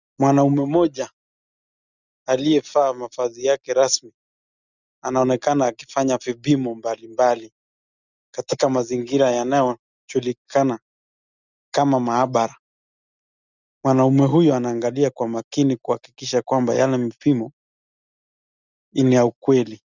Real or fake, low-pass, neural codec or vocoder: real; 7.2 kHz; none